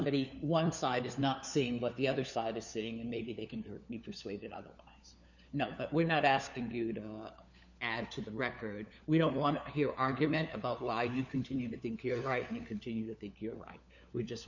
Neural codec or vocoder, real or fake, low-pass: codec, 16 kHz, 4 kbps, FunCodec, trained on LibriTTS, 50 frames a second; fake; 7.2 kHz